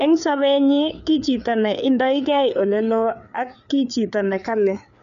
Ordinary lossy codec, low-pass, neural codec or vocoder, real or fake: none; 7.2 kHz; codec, 16 kHz, 4 kbps, FreqCodec, larger model; fake